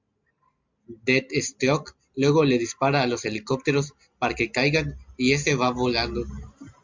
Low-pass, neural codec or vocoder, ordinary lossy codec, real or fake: 7.2 kHz; none; MP3, 64 kbps; real